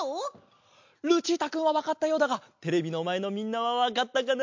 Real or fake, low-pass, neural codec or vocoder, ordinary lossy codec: real; 7.2 kHz; none; MP3, 48 kbps